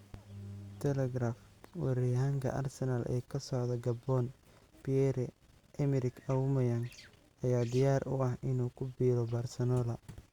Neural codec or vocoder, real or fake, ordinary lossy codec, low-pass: none; real; Opus, 64 kbps; 19.8 kHz